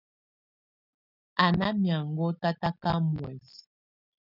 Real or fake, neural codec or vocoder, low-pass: real; none; 5.4 kHz